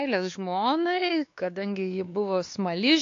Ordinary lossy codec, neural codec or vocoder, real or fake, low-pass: AAC, 48 kbps; codec, 16 kHz, 2 kbps, X-Codec, WavLM features, trained on Multilingual LibriSpeech; fake; 7.2 kHz